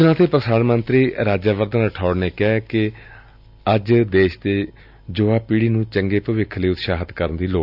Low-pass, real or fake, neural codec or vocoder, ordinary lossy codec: 5.4 kHz; real; none; none